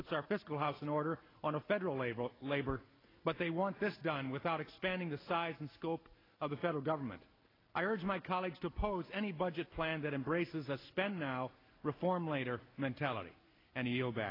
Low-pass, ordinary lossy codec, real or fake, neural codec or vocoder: 5.4 kHz; AAC, 24 kbps; real; none